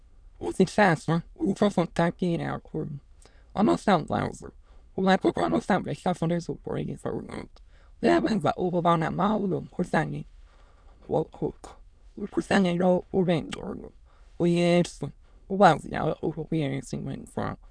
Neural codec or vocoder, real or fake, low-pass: autoencoder, 22.05 kHz, a latent of 192 numbers a frame, VITS, trained on many speakers; fake; 9.9 kHz